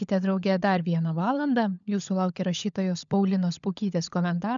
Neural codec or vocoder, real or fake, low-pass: codec, 16 kHz, 16 kbps, FunCodec, trained on LibriTTS, 50 frames a second; fake; 7.2 kHz